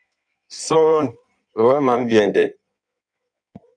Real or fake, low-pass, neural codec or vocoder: fake; 9.9 kHz; codec, 16 kHz in and 24 kHz out, 1.1 kbps, FireRedTTS-2 codec